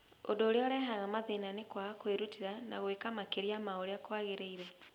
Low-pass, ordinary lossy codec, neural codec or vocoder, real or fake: 19.8 kHz; none; none; real